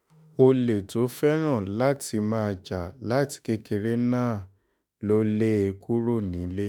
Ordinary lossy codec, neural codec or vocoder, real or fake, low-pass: none; autoencoder, 48 kHz, 32 numbers a frame, DAC-VAE, trained on Japanese speech; fake; none